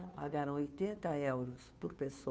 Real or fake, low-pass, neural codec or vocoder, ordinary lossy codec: fake; none; codec, 16 kHz, 2 kbps, FunCodec, trained on Chinese and English, 25 frames a second; none